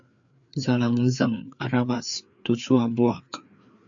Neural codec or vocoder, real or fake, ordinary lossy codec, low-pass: codec, 16 kHz, 4 kbps, FreqCodec, larger model; fake; MP3, 64 kbps; 7.2 kHz